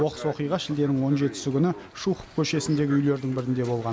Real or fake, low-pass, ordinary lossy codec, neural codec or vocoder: real; none; none; none